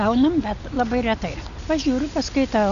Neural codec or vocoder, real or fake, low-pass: none; real; 7.2 kHz